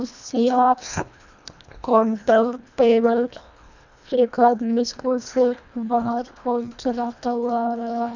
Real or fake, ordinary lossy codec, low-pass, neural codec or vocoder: fake; none; 7.2 kHz; codec, 24 kHz, 1.5 kbps, HILCodec